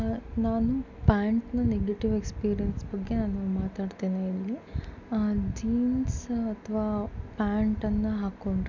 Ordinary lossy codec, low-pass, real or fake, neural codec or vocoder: none; 7.2 kHz; real; none